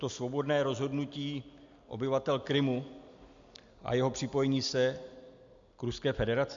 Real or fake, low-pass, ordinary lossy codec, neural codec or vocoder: real; 7.2 kHz; MP3, 64 kbps; none